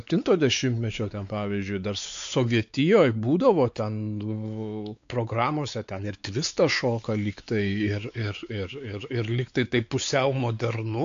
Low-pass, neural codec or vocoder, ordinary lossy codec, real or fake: 7.2 kHz; codec, 16 kHz, 4 kbps, X-Codec, WavLM features, trained on Multilingual LibriSpeech; AAC, 64 kbps; fake